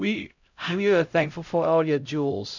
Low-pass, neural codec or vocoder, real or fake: 7.2 kHz; codec, 16 kHz, 0.5 kbps, X-Codec, HuBERT features, trained on LibriSpeech; fake